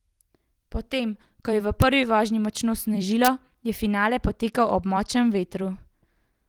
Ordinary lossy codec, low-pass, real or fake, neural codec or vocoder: Opus, 24 kbps; 19.8 kHz; fake; vocoder, 44.1 kHz, 128 mel bands every 256 samples, BigVGAN v2